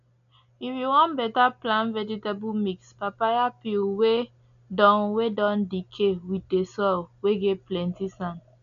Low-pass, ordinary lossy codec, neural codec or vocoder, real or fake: 7.2 kHz; none; none; real